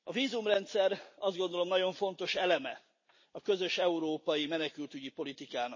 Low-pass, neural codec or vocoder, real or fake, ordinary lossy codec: 7.2 kHz; none; real; MP3, 32 kbps